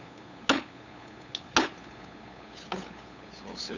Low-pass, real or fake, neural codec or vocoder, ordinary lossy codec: 7.2 kHz; fake; codec, 16 kHz, 8 kbps, FunCodec, trained on LibriTTS, 25 frames a second; none